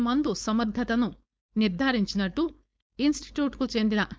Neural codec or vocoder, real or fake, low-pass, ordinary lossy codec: codec, 16 kHz, 4.8 kbps, FACodec; fake; none; none